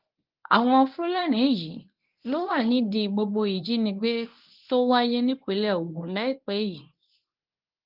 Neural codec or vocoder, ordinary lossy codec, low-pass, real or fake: codec, 24 kHz, 0.9 kbps, WavTokenizer, medium speech release version 1; Opus, 32 kbps; 5.4 kHz; fake